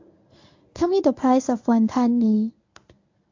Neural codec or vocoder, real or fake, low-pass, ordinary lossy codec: codec, 24 kHz, 0.9 kbps, WavTokenizer, medium speech release version 1; fake; 7.2 kHz; AAC, 48 kbps